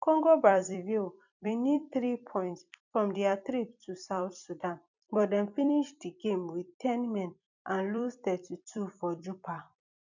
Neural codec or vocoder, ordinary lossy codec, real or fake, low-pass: vocoder, 44.1 kHz, 128 mel bands every 256 samples, BigVGAN v2; none; fake; 7.2 kHz